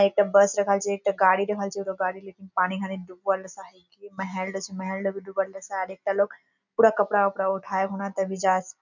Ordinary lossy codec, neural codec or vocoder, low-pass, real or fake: none; none; 7.2 kHz; real